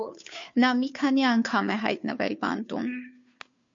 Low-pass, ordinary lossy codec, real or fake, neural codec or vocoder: 7.2 kHz; MP3, 48 kbps; fake; codec, 16 kHz, 2 kbps, FunCodec, trained on Chinese and English, 25 frames a second